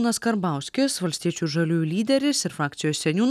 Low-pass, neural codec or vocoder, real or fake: 14.4 kHz; none; real